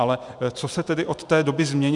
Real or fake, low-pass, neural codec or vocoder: fake; 10.8 kHz; vocoder, 44.1 kHz, 128 mel bands every 512 samples, BigVGAN v2